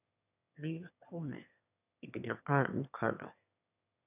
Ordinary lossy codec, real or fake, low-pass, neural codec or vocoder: none; fake; 3.6 kHz; autoencoder, 22.05 kHz, a latent of 192 numbers a frame, VITS, trained on one speaker